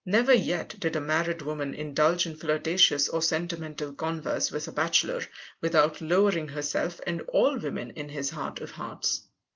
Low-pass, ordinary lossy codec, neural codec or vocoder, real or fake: 7.2 kHz; Opus, 24 kbps; none; real